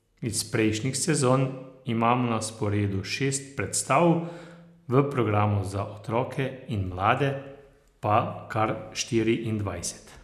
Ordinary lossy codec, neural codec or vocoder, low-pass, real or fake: none; none; 14.4 kHz; real